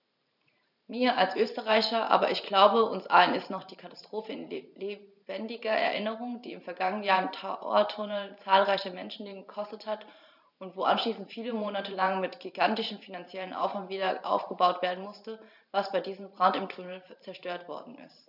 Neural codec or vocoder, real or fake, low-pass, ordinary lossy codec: vocoder, 44.1 kHz, 128 mel bands every 512 samples, BigVGAN v2; fake; 5.4 kHz; none